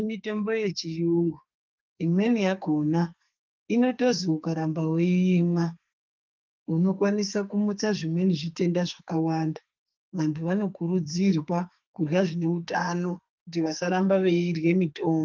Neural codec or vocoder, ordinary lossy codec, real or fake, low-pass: codec, 44.1 kHz, 2.6 kbps, SNAC; Opus, 32 kbps; fake; 7.2 kHz